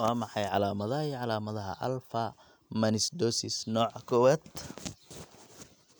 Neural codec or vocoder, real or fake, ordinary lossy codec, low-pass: none; real; none; none